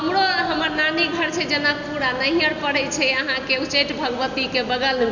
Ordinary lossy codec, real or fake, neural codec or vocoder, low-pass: none; real; none; 7.2 kHz